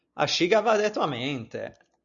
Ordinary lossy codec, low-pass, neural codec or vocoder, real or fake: MP3, 96 kbps; 7.2 kHz; none; real